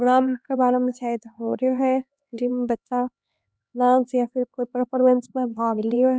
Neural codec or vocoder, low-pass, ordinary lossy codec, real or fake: codec, 16 kHz, 2 kbps, X-Codec, HuBERT features, trained on LibriSpeech; none; none; fake